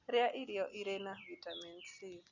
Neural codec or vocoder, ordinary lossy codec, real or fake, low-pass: none; none; real; 7.2 kHz